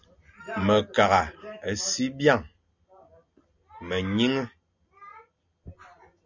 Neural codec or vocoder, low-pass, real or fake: none; 7.2 kHz; real